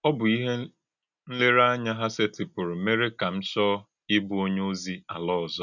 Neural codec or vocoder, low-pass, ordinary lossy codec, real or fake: none; 7.2 kHz; none; real